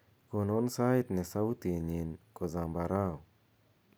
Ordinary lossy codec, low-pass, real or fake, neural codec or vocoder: none; none; real; none